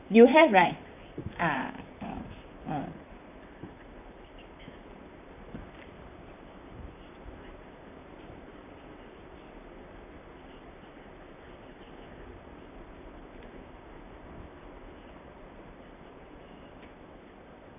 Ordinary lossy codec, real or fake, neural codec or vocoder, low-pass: none; fake; vocoder, 44.1 kHz, 128 mel bands, Pupu-Vocoder; 3.6 kHz